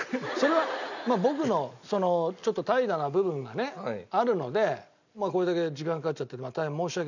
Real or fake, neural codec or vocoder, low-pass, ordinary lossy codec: real; none; 7.2 kHz; none